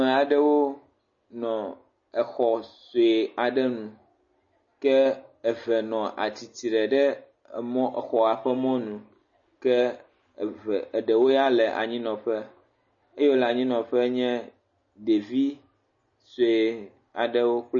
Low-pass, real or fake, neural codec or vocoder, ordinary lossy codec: 7.2 kHz; real; none; MP3, 32 kbps